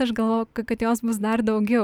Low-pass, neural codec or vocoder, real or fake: 19.8 kHz; none; real